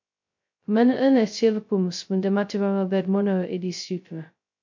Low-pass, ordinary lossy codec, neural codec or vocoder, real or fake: 7.2 kHz; MP3, 48 kbps; codec, 16 kHz, 0.2 kbps, FocalCodec; fake